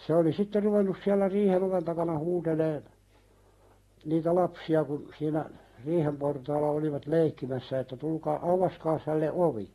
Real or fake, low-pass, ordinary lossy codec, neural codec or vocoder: real; 19.8 kHz; AAC, 32 kbps; none